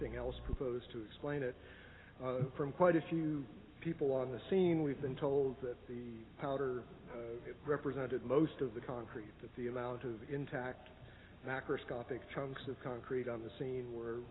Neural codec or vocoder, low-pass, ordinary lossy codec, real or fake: none; 7.2 kHz; AAC, 16 kbps; real